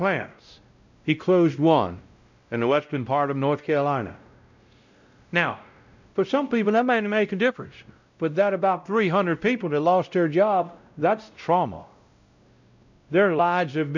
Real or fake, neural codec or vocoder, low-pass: fake; codec, 16 kHz, 0.5 kbps, X-Codec, WavLM features, trained on Multilingual LibriSpeech; 7.2 kHz